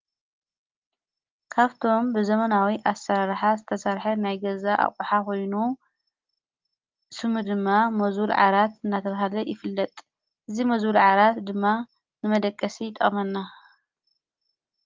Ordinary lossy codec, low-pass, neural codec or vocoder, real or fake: Opus, 32 kbps; 7.2 kHz; none; real